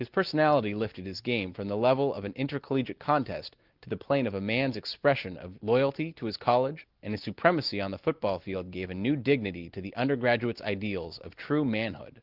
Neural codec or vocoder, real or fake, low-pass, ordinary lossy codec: none; real; 5.4 kHz; Opus, 32 kbps